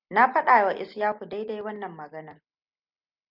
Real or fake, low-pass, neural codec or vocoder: real; 5.4 kHz; none